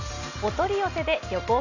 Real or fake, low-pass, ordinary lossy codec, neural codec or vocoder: real; 7.2 kHz; none; none